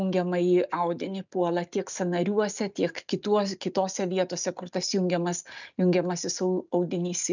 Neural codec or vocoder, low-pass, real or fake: none; 7.2 kHz; real